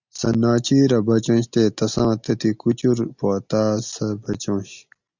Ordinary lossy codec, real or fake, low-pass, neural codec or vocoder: Opus, 64 kbps; real; 7.2 kHz; none